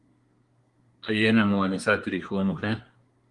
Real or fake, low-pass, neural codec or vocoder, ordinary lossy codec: fake; 10.8 kHz; codec, 24 kHz, 1 kbps, SNAC; Opus, 24 kbps